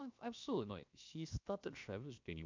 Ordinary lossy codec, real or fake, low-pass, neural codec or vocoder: MP3, 64 kbps; fake; 7.2 kHz; codec, 16 kHz, 0.7 kbps, FocalCodec